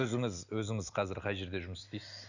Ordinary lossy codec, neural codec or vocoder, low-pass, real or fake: none; none; 7.2 kHz; real